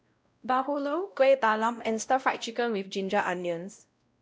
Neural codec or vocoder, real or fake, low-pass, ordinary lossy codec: codec, 16 kHz, 0.5 kbps, X-Codec, WavLM features, trained on Multilingual LibriSpeech; fake; none; none